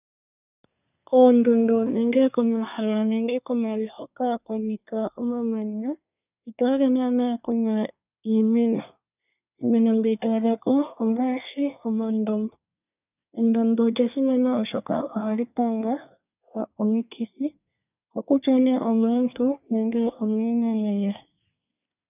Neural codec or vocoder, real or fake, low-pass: codec, 24 kHz, 1 kbps, SNAC; fake; 3.6 kHz